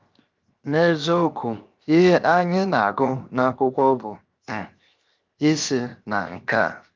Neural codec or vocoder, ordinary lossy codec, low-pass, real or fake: codec, 16 kHz, 0.7 kbps, FocalCodec; Opus, 24 kbps; 7.2 kHz; fake